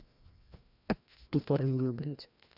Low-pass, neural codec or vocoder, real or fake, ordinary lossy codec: 5.4 kHz; codec, 16 kHz, 1 kbps, FreqCodec, larger model; fake; none